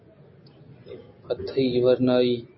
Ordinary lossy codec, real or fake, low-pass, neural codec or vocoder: MP3, 24 kbps; real; 7.2 kHz; none